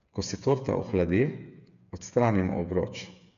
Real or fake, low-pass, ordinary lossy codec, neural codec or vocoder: fake; 7.2 kHz; none; codec, 16 kHz, 8 kbps, FreqCodec, smaller model